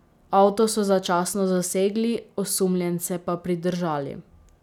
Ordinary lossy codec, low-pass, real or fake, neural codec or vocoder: none; 19.8 kHz; real; none